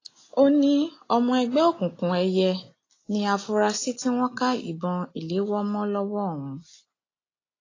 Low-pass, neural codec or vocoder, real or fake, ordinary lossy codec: 7.2 kHz; none; real; AAC, 32 kbps